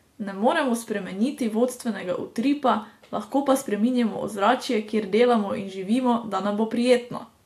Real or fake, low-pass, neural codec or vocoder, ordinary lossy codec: real; 14.4 kHz; none; AAC, 64 kbps